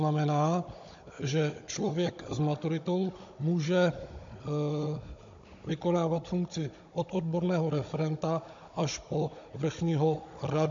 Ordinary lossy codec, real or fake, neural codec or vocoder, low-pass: MP3, 48 kbps; fake; codec, 16 kHz, 16 kbps, FunCodec, trained on Chinese and English, 50 frames a second; 7.2 kHz